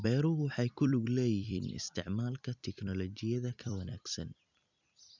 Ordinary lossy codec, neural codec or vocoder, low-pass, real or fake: none; vocoder, 44.1 kHz, 128 mel bands every 256 samples, BigVGAN v2; 7.2 kHz; fake